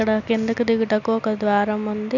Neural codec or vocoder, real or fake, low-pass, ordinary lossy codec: none; real; 7.2 kHz; none